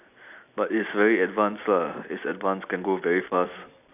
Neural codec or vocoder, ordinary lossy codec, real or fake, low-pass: none; none; real; 3.6 kHz